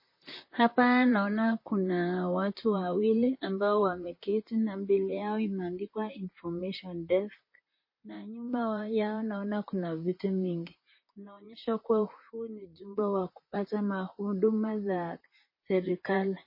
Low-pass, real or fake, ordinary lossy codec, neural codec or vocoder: 5.4 kHz; fake; MP3, 24 kbps; vocoder, 44.1 kHz, 128 mel bands, Pupu-Vocoder